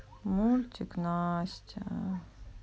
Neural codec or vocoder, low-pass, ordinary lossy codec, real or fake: none; none; none; real